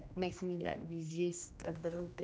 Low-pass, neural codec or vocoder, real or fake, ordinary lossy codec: none; codec, 16 kHz, 1 kbps, X-Codec, HuBERT features, trained on general audio; fake; none